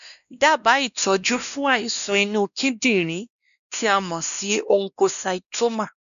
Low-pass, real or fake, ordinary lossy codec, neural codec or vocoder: 7.2 kHz; fake; none; codec, 16 kHz, 1 kbps, X-Codec, WavLM features, trained on Multilingual LibriSpeech